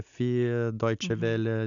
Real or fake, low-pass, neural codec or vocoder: real; 7.2 kHz; none